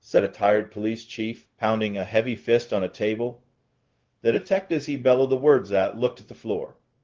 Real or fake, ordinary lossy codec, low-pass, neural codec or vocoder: fake; Opus, 16 kbps; 7.2 kHz; codec, 16 kHz, 0.4 kbps, LongCat-Audio-Codec